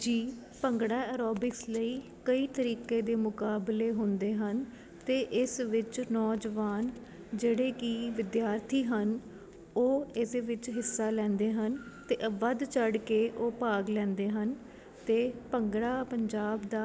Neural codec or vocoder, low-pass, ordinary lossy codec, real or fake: none; none; none; real